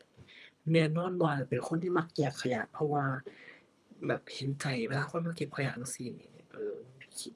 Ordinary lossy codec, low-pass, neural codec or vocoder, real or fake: none; none; codec, 24 kHz, 3 kbps, HILCodec; fake